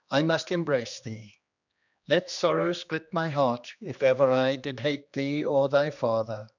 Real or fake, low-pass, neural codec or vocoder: fake; 7.2 kHz; codec, 16 kHz, 2 kbps, X-Codec, HuBERT features, trained on general audio